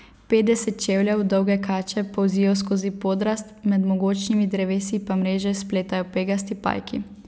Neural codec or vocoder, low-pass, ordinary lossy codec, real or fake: none; none; none; real